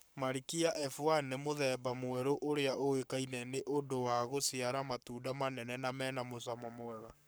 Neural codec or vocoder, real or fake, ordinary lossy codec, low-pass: codec, 44.1 kHz, 7.8 kbps, Pupu-Codec; fake; none; none